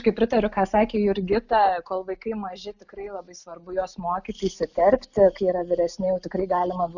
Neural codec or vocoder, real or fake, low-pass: none; real; 7.2 kHz